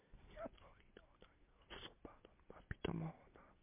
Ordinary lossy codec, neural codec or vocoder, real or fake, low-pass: MP3, 32 kbps; none; real; 3.6 kHz